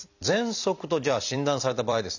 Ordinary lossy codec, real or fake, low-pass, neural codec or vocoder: none; fake; 7.2 kHz; vocoder, 44.1 kHz, 128 mel bands every 256 samples, BigVGAN v2